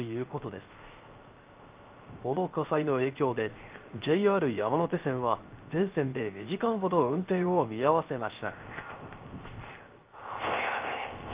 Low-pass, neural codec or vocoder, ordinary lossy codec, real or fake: 3.6 kHz; codec, 16 kHz, 0.3 kbps, FocalCodec; Opus, 24 kbps; fake